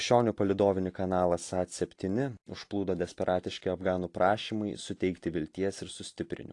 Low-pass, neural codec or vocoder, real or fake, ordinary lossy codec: 10.8 kHz; none; real; AAC, 48 kbps